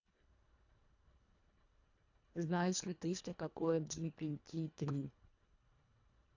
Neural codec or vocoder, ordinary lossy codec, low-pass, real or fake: codec, 24 kHz, 1.5 kbps, HILCodec; AAC, 48 kbps; 7.2 kHz; fake